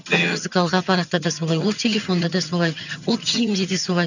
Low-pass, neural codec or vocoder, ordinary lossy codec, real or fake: 7.2 kHz; vocoder, 22.05 kHz, 80 mel bands, HiFi-GAN; none; fake